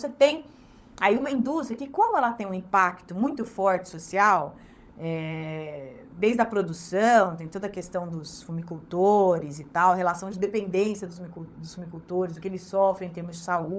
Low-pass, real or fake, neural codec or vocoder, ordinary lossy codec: none; fake; codec, 16 kHz, 16 kbps, FunCodec, trained on LibriTTS, 50 frames a second; none